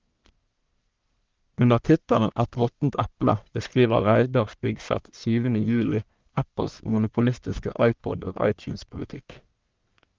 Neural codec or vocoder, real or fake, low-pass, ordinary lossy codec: codec, 44.1 kHz, 1.7 kbps, Pupu-Codec; fake; 7.2 kHz; Opus, 32 kbps